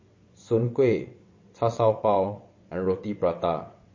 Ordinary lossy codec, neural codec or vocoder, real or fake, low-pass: MP3, 32 kbps; vocoder, 22.05 kHz, 80 mel bands, WaveNeXt; fake; 7.2 kHz